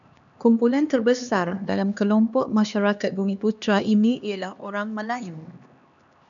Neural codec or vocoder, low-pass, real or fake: codec, 16 kHz, 2 kbps, X-Codec, HuBERT features, trained on LibriSpeech; 7.2 kHz; fake